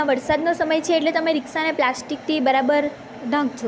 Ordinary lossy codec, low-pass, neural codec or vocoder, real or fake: none; none; none; real